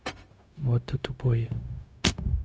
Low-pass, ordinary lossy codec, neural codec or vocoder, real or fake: none; none; codec, 16 kHz, 0.4 kbps, LongCat-Audio-Codec; fake